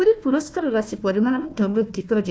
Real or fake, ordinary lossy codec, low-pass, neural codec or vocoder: fake; none; none; codec, 16 kHz, 1 kbps, FunCodec, trained on Chinese and English, 50 frames a second